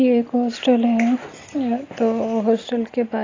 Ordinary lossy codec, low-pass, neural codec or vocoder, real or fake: AAC, 32 kbps; 7.2 kHz; none; real